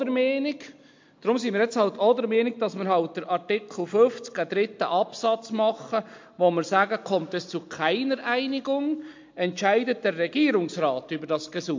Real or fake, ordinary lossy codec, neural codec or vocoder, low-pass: real; MP3, 48 kbps; none; 7.2 kHz